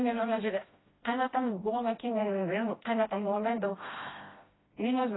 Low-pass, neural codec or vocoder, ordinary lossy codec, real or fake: 7.2 kHz; codec, 16 kHz, 1 kbps, FreqCodec, smaller model; AAC, 16 kbps; fake